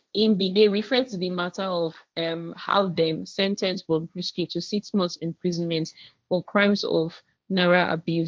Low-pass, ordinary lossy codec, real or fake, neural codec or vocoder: none; none; fake; codec, 16 kHz, 1.1 kbps, Voila-Tokenizer